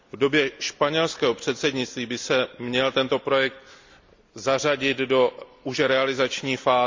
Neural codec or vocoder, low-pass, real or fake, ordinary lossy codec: none; 7.2 kHz; real; MP3, 64 kbps